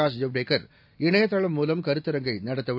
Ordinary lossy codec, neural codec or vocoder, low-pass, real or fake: AAC, 48 kbps; none; 5.4 kHz; real